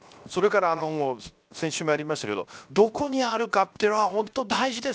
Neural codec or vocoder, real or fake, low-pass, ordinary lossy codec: codec, 16 kHz, 0.7 kbps, FocalCodec; fake; none; none